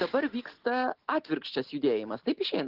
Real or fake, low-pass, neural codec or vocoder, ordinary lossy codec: real; 5.4 kHz; none; Opus, 16 kbps